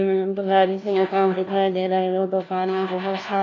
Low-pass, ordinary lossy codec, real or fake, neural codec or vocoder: 7.2 kHz; AAC, 32 kbps; fake; codec, 16 kHz, 1 kbps, FunCodec, trained on LibriTTS, 50 frames a second